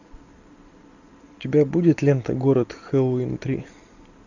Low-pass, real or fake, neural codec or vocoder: 7.2 kHz; real; none